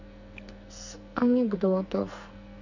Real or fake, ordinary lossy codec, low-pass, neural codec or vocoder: fake; none; 7.2 kHz; codec, 44.1 kHz, 2.6 kbps, SNAC